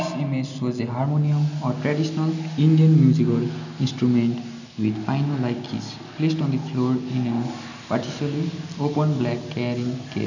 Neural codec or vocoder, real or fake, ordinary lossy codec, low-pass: none; real; none; 7.2 kHz